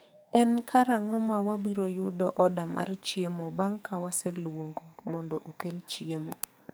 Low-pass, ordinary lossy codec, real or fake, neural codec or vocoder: none; none; fake; codec, 44.1 kHz, 2.6 kbps, SNAC